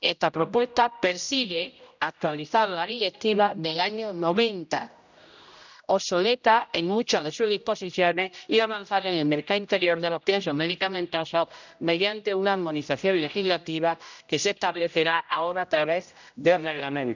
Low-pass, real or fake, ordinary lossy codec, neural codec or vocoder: 7.2 kHz; fake; none; codec, 16 kHz, 0.5 kbps, X-Codec, HuBERT features, trained on general audio